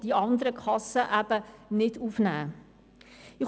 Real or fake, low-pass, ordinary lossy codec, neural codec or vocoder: real; none; none; none